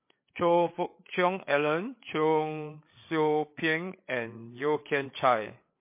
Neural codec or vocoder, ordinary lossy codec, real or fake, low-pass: codec, 16 kHz, 8 kbps, FreqCodec, larger model; MP3, 24 kbps; fake; 3.6 kHz